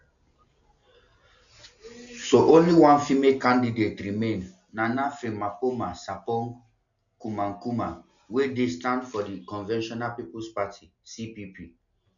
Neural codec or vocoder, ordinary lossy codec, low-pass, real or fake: none; none; 7.2 kHz; real